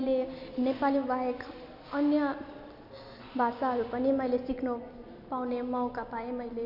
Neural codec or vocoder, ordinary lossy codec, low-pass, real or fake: none; none; 5.4 kHz; real